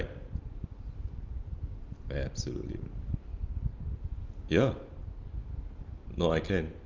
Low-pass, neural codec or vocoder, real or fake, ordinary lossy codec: 7.2 kHz; none; real; Opus, 24 kbps